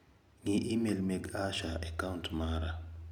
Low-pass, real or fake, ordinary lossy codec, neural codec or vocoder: 19.8 kHz; real; none; none